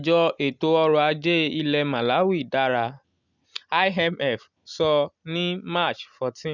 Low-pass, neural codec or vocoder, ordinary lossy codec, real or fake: 7.2 kHz; none; none; real